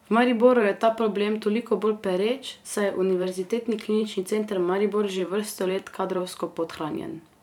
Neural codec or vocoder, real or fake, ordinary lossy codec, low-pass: vocoder, 44.1 kHz, 128 mel bands every 512 samples, BigVGAN v2; fake; none; 19.8 kHz